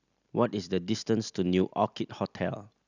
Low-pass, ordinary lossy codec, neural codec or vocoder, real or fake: 7.2 kHz; none; none; real